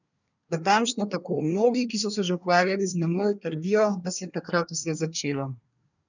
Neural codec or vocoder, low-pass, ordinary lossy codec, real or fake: codec, 24 kHz, 1 kbps, SNAC; 7.2 kHz; none; fake